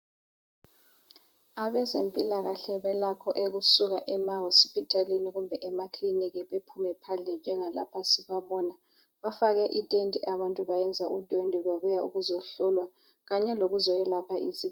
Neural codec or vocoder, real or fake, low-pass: vocoder, 44.1 kHz, 128 mel bands, Pupu-Vocoder; fake; 19.8 kHz